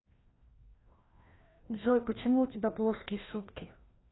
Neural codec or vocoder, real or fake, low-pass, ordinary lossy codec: codec, 16 kHz, 1 kbps, FreqCodec, larger model; fake; 7.2 kHz; AAC, 16 kbps